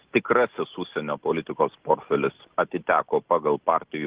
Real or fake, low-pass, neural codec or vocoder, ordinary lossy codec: real; 3.6 kHz; none; Opus, 16 kbps